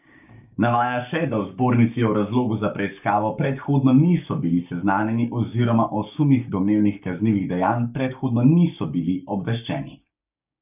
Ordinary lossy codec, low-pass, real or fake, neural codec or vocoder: none; 3.6 kHz; fake; codec, 16 kHz, 6 kbps, DAC